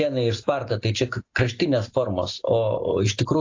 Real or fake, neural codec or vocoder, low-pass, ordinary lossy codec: real; none; 7.2 kHz; AAC, 48 kbps